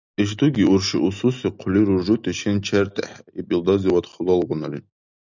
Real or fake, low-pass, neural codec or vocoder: real; 7.2 kHz; none